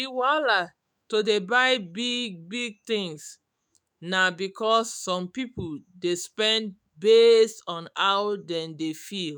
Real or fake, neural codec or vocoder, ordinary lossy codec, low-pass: fake; autoencoder, 48 kHz, 128 numbers a frame, DAC-VAE, trained on Japanese speech; none; none